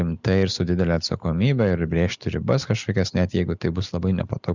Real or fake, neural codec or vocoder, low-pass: real; none; 7.2 kHz